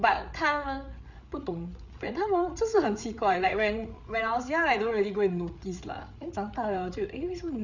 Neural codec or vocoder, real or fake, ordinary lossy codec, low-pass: codec, 16 kHz, 8 kbps, FreqCodec, larger model; fake; none; 7.2 kHz